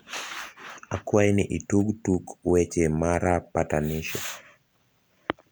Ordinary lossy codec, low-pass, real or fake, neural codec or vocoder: none; none; real; none